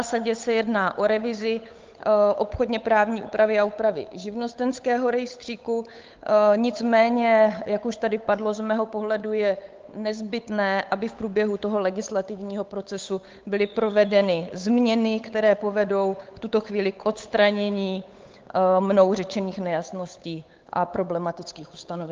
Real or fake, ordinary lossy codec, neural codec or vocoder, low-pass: fake; Opus, 24 kbps; codec, 16 kHz, 8 kbps, FunCodec, trained on Chinese and English, 25 frames a second; 7.2 kHz